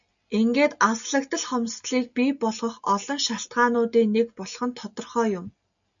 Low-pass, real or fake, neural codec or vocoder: 7.2 kHz; real; none